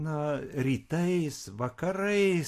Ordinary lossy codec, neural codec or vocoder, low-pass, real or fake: AAC, 64 kbps; none; 14.4 kHz; real